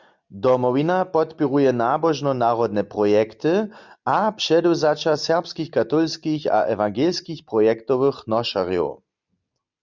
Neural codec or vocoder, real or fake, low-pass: none; real; 7.2 kHz